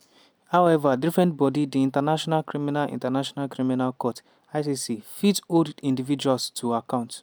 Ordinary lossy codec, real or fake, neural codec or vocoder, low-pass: none; real; none; none